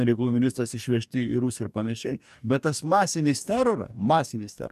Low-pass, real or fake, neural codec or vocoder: 14.4 kHz; fake; codec, 44.1 kHz, 2.6 kbps, DAC